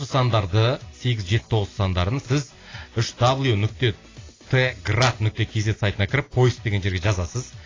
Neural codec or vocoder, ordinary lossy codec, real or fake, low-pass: none; AAC, 32 kbps; real; 7.2 kHz